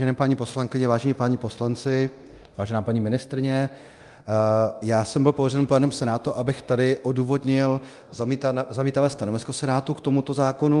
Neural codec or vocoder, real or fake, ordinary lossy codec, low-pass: codec, 24 kHz, 0.9 kbps, DualCodec; fake; Opus, 32 kbps; 10.8 kHz